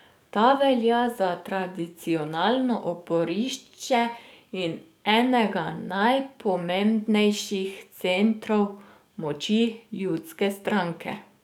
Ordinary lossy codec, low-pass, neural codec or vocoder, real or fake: none; 19.8 kHz; codec, 44.1 kHz, 7.8 kbps, DAC; fake